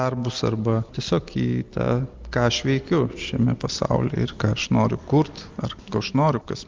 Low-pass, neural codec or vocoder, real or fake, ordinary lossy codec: 7.2 kHz; none; real; Opus, 24 kbps